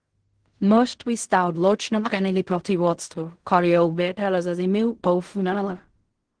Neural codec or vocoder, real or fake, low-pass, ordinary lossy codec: codec, 16 kHz in and 24 kHz out, 0.4 kbps, LongCat-Audio-Codec, fine tuned four codebook decoder; fake; 9.9 kHz; Opus, 16 kbps